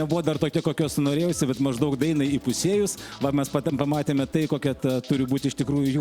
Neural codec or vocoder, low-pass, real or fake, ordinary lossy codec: none; 19.8 kHz; real; Opus, 64 kbps